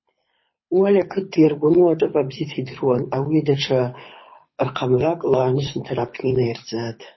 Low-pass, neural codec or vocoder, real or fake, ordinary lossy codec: 7.2 kHz; codec, 24 kHz, 6 kbps, HILCodec; fake; MP3, 24 kbps